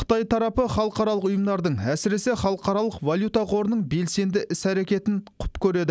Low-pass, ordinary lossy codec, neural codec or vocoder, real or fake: none; none; none; real